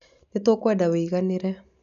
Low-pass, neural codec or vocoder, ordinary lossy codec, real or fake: 7.2 kHz; none; none; real